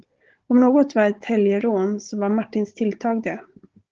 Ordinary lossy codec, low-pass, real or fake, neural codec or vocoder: Opus, 16 kbps; 7.2 kHz; fake; codec, 16 kHz, 16 kbps, FunCodec, trained on Chinese and English, 50 frames a second